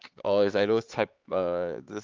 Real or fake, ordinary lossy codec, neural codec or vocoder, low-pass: fake; Opus, 32 kbps; codec, 16 kHz, 4 kbps, X-Codec, HuBERT features, trained on LibriSpeech; 7.2 kHz